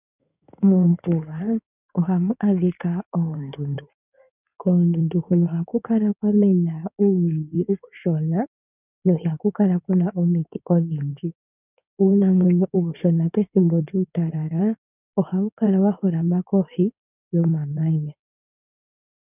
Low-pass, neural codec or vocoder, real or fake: 3.6 kHz; codec, 16 kHz in and 24 kHz out, 2.2 kbps, FireRedTTS-2 codec; fake